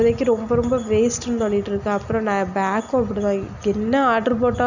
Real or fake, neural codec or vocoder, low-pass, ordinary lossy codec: real; none; 7.2 kHz; none